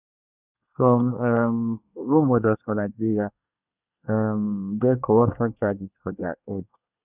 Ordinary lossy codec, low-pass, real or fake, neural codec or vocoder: none; 3.6 kHz; fake; codec, 24 kHz, 1 kbps, SNAC